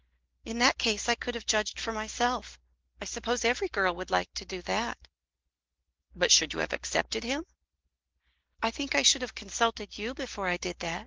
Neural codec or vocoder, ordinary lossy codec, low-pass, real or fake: none; Opus, 16 kbps; 7.2 kHz; real